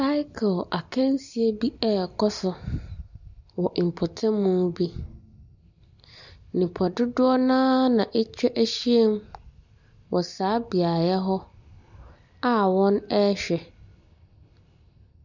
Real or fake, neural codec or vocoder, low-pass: real; none; 7.2 kHz